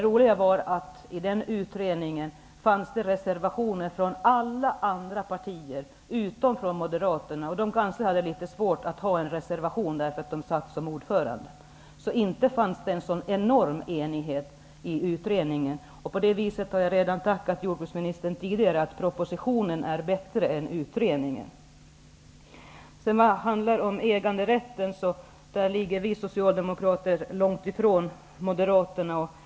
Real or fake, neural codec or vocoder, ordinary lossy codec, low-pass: real; none; none; none